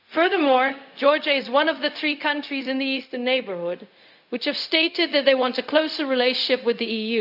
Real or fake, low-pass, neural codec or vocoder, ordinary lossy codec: fake; 5.4 kHz; codec, 16 kHz, 0.4 kbps, LongCat-Audio-Codec; none